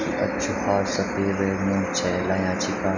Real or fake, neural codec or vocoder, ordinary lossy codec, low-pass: real; none; none; 7.2 kHz